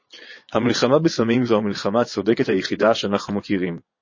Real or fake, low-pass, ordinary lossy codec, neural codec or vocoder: fake; 7.2 kHz; MP3, 32 kbps; vocoder, 22.05 kHz, 80 mel bands, Vocos